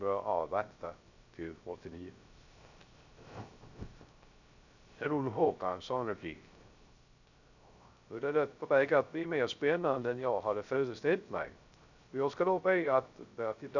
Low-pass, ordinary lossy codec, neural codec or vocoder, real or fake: 7.2 kHz; none; codec, 16 kHz, 0.3 kbps, FocalCodec; fake